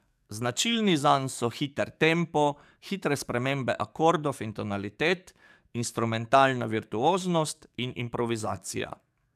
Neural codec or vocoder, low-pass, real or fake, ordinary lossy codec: codec, 44.1 kHz, 7.8 kbps, DAC; 14.4 kHz; fake; none